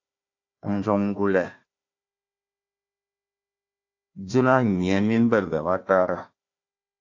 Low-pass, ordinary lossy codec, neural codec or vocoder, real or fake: 7.2 kHz; AAC, 48 kbps; codec, 16 kHz, 1 kbps, FunCodec, trained on Chinese and English, 50 frames a second; fake